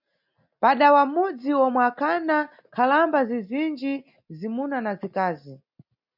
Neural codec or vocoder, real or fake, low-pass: none; real; 5.4 kHz